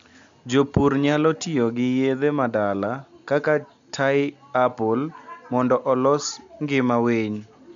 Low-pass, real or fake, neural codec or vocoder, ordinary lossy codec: 7.2 kHz; real; none; MP3, 64 kbps